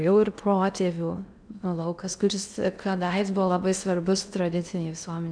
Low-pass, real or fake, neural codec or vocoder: 9.9 kHz; fake; codec, 16 kHz in and 24 kHz out, 0.6 kbps, FocalCodec, streaming, 2048 codes